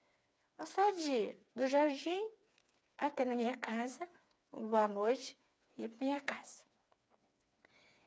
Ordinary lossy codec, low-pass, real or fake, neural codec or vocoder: none; none; fake; codec, 16 kHz, 4 kbps, FreqCodec, smaller model